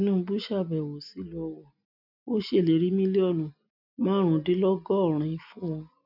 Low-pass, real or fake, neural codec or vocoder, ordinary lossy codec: 5.4 kHz; real; none; none